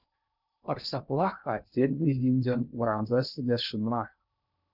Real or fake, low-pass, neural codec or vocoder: fake; 5.4 kHz; codec, 16 kHz in and 24 kHz out, 0.6 kbps, FocalCodec, streaming, 2048 codes